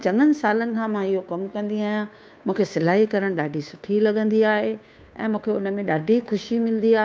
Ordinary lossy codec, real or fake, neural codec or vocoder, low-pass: none; fake; codec, 16 kHz, 2 kbps, FunCodec, trained on Chinese and English, 25 frames a second; none